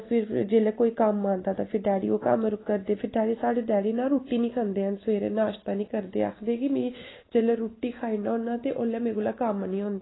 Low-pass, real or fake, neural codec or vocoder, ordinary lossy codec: 7.2 kHz; real; none; AAC, 16 kbps